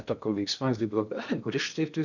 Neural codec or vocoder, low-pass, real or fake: codec, 16 kHz in and 24 kHz out, 0.6 kbps, FocalCodec, streaming, 2048 codes; 7.2 kHz; fake